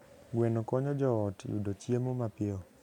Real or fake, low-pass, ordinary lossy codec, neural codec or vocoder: real; 19.8 kHz; none; none